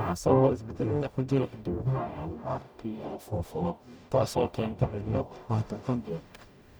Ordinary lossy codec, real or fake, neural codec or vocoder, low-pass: none; fake; codec, 44.1 kHz, 0.9 kbps, DAC; none